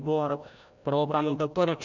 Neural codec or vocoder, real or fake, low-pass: codec, 16 kHz, 0.5 kbps, FreqCodec, larger model; fake; 7.2 kHz